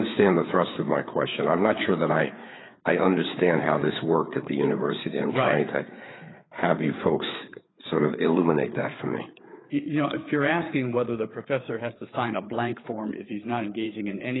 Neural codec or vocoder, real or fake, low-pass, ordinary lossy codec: codec, 16 kHz, 4 kbps, FreqCodec, larger model; fake; 7.2 kHz; AAC, 16 kbps